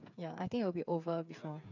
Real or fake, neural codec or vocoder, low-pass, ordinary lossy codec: fake; codec, 16 kHz, 8 kbps, FreqCodec, smaller model; 7.2 kHz; none